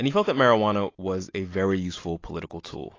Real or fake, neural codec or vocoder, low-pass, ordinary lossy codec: real; none; 7.2 kHz; AAC, 32 kbps